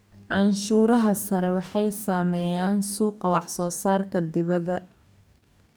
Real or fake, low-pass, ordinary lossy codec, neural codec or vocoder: fake; none; none; codec, 44.1 kHz, 2.6 kbps, DAC